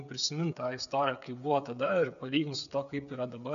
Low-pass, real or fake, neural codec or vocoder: 7.2 kHz; fake; codec, 16 kHz, 8 kbps, FreqCodec, smaller model